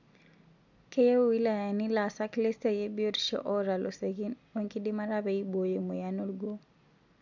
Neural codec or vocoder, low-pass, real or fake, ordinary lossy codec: none; 7.2 kHz; real; none